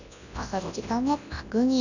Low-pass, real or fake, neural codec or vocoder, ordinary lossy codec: 7.2 kHz; fake; codec, 24 kHz, 0.9 kbps, WavTokenizer, large speech release; none